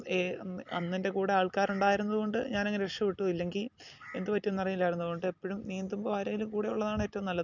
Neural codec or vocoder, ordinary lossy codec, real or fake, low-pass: none; none; real; 7.2 kHz